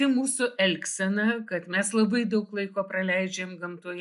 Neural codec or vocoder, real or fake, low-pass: none; real; 10.8 kHz